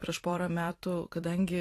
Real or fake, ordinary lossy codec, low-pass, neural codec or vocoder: fake; AAC, 48 kbps; 14.4 kHz; vocoder, 44.1 kHz, 128 mel bands every 256 samples, BigVGAN v2